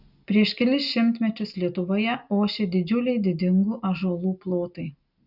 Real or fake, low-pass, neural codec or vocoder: real; 5.4 kHz; none